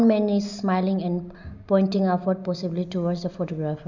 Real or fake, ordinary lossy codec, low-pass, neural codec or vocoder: real; none; 7.2 kHz; none